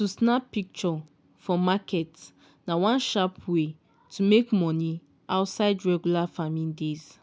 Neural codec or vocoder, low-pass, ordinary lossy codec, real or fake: none; none; none; real